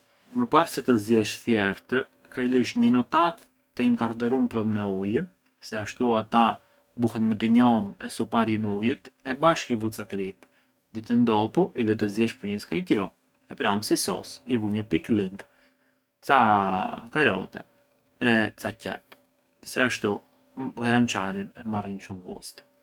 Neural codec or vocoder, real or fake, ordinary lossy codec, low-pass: codec, 44.1 kHz, 2.6 kbps, DAC; fake; none; 19.8 kHz